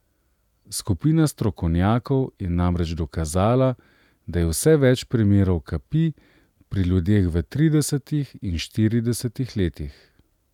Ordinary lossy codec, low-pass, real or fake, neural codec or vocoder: none; 19.8 kHz; real; none